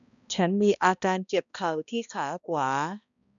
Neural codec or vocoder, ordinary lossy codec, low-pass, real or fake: codec, 16 kHz, 1 kbps, X-Codec, HuBERT features, trained on balanced general audio; none; 7.2 kHz; fake